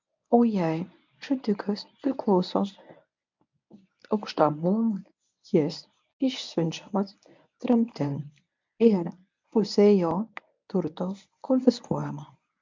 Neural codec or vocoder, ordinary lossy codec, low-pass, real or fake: codec, 24 kHz, 0.9 kbps, WavTokenizer, medium speech release version 1; MP3, 64 kbps; 7.2 kHz; fake